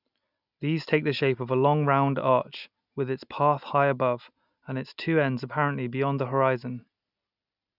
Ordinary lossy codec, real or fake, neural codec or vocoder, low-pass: none; real; none; 5.4 kHz